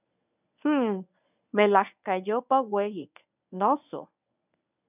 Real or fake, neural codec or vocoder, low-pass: fake; codec, 24 kHz, 0.9 kbps, WavTokenizer, medium speech release version 1; 3.6 kHz